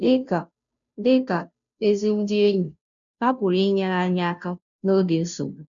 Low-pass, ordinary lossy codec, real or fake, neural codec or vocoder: 7.2 kHz; none; fake; codec, 16 kHz, 0.5 kbps, FunCodec, trained on Chinese and English, 25 frames a second